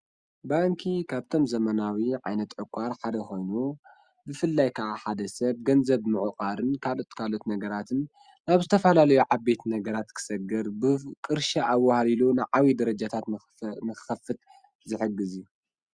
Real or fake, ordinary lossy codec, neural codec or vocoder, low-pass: real; Opus, 64 kbps; none; 9.9 kHz